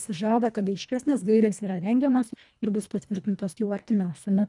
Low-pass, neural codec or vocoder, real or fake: 10.8 kHz; codec, 24 kHz, 1.5 kbps, HILCodec; fake